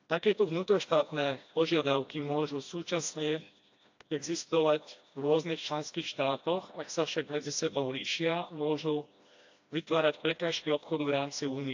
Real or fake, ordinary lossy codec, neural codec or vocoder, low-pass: fake; AAC, 48 kbps; codec, 16 kHz, 1 kbps, FreqCodec, smaller model; 7.2 kHz